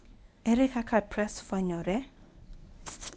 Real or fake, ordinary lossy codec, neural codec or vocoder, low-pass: fake; none; codec, 24 kHz, 0.9 kbps, WavTokenizer, medium speech release version 1; none